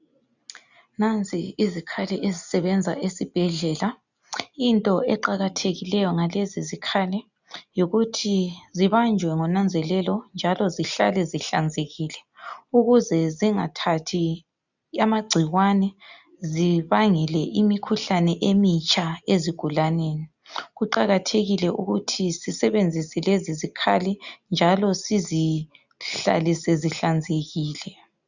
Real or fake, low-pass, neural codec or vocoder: real; 7.2 kHz; none